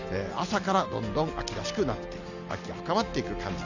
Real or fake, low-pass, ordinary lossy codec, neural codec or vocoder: real; 7.2 kHz; none; none